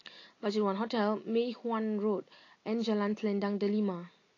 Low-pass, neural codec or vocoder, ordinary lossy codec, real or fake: 7.2 kHz; none; AAC, 32 kbps; real